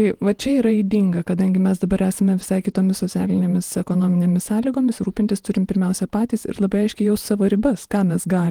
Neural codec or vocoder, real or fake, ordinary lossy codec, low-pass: vocoder, 48 kHz, 128 mel bands, Vocos; fake; Opus, 24 kbps; 19.8 kHz